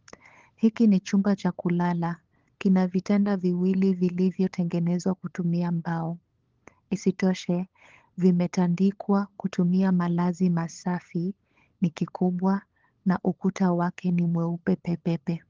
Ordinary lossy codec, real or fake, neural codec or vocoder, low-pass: Opus, 16 kbps; fake; codec, 16 kHz, 8 kbps, FunCodec, trained on LibriTTS, 25 frames a second; 7.2 kHz